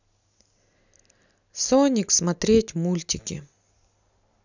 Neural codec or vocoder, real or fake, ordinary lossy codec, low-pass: none; real; none; 7.2 kHz